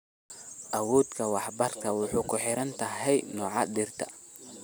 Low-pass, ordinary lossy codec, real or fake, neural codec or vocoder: none; none; real; none